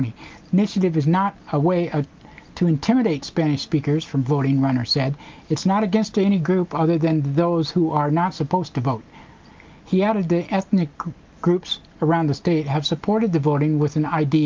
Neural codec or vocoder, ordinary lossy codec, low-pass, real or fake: none; Opus, 32 kbps; 7.2 kHz; real